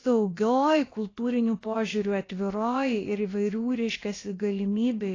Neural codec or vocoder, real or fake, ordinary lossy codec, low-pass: codec, 16 kHz, about 1 kbps, DyCAST, with the encoder's durations; fake; AAC, 32 kbps; 7.2 kHz